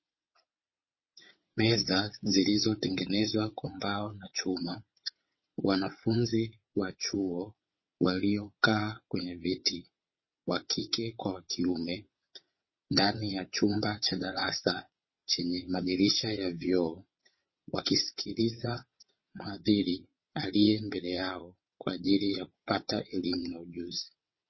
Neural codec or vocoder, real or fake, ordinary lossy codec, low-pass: vocoder, 22.05 kHz, 80 mel bands, WaveNeXt; fake; MP3, 24 kbps; 7.2 kHz